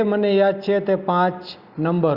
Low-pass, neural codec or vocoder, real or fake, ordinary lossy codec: 5.4 kHz; none; real; Opus, 64 kbps